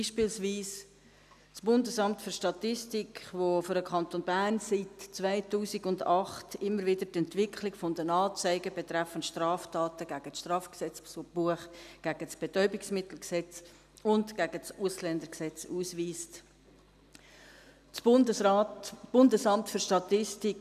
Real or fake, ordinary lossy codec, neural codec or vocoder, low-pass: real; none; none; 14.4 kHz